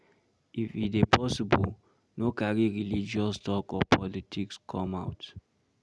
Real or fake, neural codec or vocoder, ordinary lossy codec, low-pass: real; none; none; none